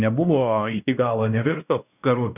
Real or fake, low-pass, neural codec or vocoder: fake; 3.6 kHz; codec, 16 kHz, 1 kbps, X-Codec, WavLM features, trained on Multilingual LibriSpeech